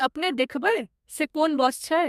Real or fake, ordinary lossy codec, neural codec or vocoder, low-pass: fake; MP3, 96 kbps; codec, 32 kHz, 1.9 kbps, SNAC; 14.4 kHz